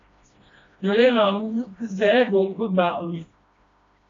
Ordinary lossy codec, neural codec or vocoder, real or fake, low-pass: AAC, 48 kbps; codec, 16 kHz, 1 kbps, FreqCodec, smaller model; fake; 7.2 kHz